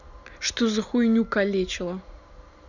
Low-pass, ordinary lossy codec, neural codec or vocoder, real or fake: 7.2 kHz; none; none; real